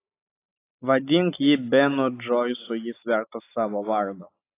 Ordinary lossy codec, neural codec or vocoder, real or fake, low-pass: AAC, 24 kbps; none; real; 3.6 kHz